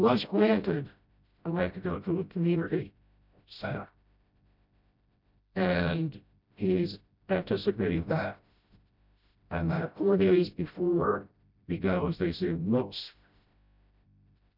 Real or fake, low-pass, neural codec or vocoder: fake; 5.4 kHz; codec, 16 kHz, 0.5 kbps, FreqCodec, smaller model